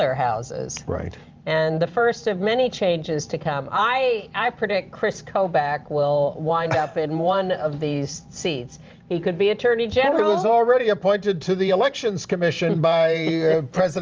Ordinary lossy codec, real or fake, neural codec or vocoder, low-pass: Opus, 24 kbps; fake; vocoder, 44.1 kHz, 128 mel bands every 512 samples, BigVGAN v2; 7.2 kHz